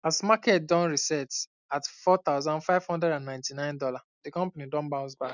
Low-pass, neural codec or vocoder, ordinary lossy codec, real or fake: 7.2 kHz; none; none; real